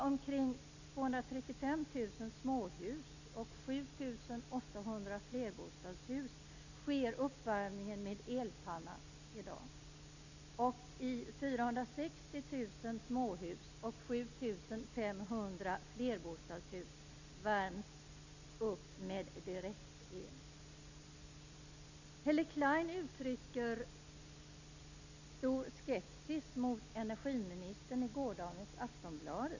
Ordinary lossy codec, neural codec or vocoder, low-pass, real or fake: none; none; 7.2 kHz; real